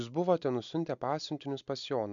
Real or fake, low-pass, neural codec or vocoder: real; 7.2 kHz; none